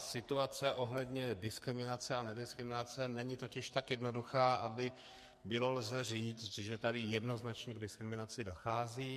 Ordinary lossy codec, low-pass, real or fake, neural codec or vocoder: MP3, 64 kbps; 14.4 kHz; fake; codec, 44.1 kHz, 2.6 kbps, SNAC